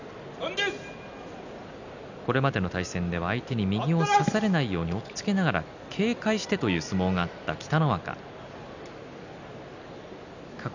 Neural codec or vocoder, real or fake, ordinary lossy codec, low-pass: none; real; none; 7.2 kHz